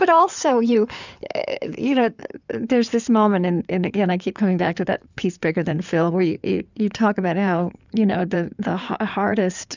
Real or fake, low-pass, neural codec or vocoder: fake; 7.2 kHz; codec, 16 kHz in and 24 kHz out, 2.2 kbps, FireRedTTS-2 codec